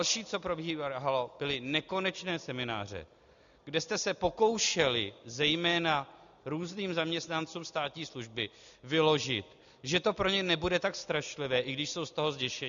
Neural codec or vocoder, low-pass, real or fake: none; 7.2 kHz; real